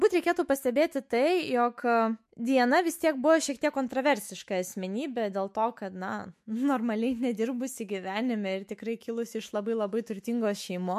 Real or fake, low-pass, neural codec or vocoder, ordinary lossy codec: fake; 14.4 kHz; autoencoder, 48 kHz, 128 numbers a frame, DAC-VAE, trained on Japanese speech; MP3, 64 kbps